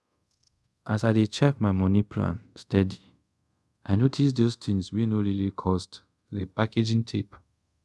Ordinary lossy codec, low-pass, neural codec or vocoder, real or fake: none; none; codec, 24 kHz, 0.5 kbps, DualCodec; fake